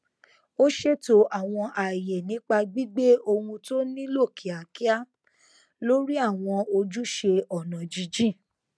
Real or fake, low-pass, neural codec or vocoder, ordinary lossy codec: real; none; none; none